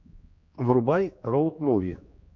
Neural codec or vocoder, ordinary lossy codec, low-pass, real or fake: codec, 16 kHz, 2 kbps, X-Codec, HuBERT features, trained on general audio; MP3, 48 kbps; 7.2 kHz; fake